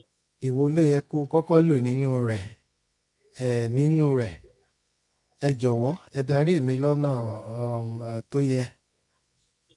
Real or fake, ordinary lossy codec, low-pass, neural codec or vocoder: fake; MP3, 64 kbps; 10.8 kHz; codec, 24 kHz, 0.9 kbps, WavTokenizer, medium music audio release